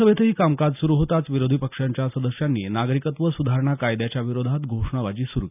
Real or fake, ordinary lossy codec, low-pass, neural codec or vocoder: real; none; 3.6 kHz; none